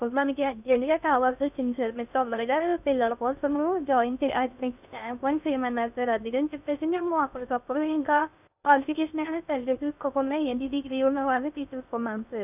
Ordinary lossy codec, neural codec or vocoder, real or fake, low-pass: none; codec, 16 kHz in and 24 kHz out, 0.6 kbps, FocalCodec, streaming, 2048 codes; fake; 3.6 kHz